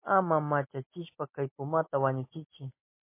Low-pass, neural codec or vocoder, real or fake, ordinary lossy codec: 3.6 kHz; none; real; MP3, 24 kbps